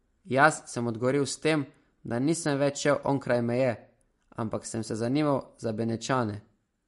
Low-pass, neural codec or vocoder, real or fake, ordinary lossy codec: 14.4 kHz; none; real; MP3, 48 kbps